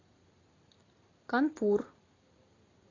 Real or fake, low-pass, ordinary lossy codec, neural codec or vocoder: real; 7.2 kHz; AAC, 32 kbps; none